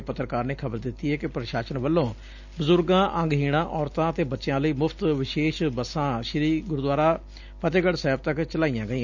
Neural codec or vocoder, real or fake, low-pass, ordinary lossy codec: none; real; 7.2 kHz; none